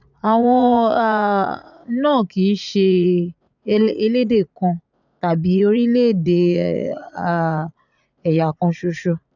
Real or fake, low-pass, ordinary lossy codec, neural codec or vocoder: fake; 7.2 kHz; none; vocoder, 44.1 kHz, 80 mel bands, Vocos